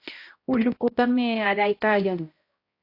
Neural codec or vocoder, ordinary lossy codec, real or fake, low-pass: codec, 16 kHz, 0.5 kbps, X-Codec, HuBERT features, trained on balanced general audio; AAC, 32 kbps; fake; 5.4 kHz